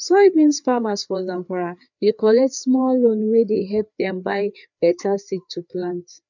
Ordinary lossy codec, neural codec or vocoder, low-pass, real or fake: none; codec, 16 kHz, 4 kbps, FreqCodec, larger model; 7.2 kHz; fake